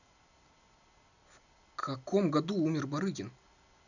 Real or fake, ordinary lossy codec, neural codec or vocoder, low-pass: real; none; none; 7.2 kHz